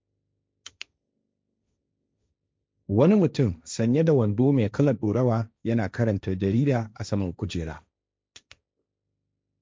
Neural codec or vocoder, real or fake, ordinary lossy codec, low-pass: codec, 16 kHz, 1.1 kbps, Voila-Tokenizer; fake; none; none